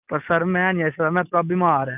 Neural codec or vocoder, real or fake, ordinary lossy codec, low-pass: none; real; none; 3.6 kHz